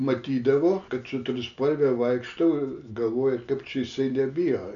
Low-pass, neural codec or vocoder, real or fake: 7.2 kHz; none; real